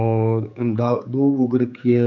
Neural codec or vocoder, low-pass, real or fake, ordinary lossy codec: codec, 16 kHz, 4 kbps, X-Codec, HuBERT features, trained on general audio; 7.2 kHz; fake; none